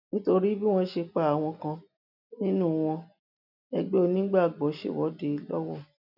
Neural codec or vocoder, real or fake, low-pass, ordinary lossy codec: none; real; 5.4 kHz; none